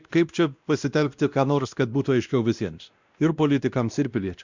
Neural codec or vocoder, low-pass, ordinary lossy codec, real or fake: codec, 16 kHz, 1 kbps, X-Codec, WavLM features, trained on Multilingual LibriSpeech; 7.2 kHz; Opus, 64 kbps; fake